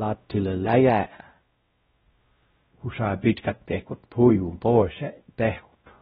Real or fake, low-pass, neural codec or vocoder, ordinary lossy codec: fake; 7.2 kHz; codec, 16 kHz, 0.5 kbps, X-Codec, WavLM features, trained on Multilingual LibriSpeech; AAC, 16 kbps